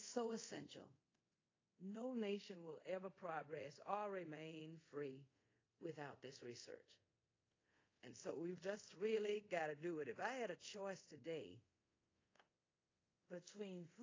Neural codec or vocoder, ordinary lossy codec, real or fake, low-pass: codec, 24 kHz, 0.5 kbps, DualCodec; AAC, 32 kbps; fake; 7.2 kHz